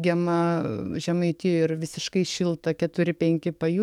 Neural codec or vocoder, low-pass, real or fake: codec, 44.1 kHz, 7.8 kbps, DAC; 19.8 kHz; fake